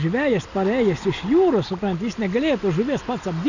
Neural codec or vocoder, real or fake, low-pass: none; real; 7.2 kHz